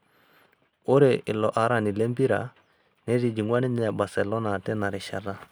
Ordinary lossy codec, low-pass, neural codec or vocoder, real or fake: none; none; none; real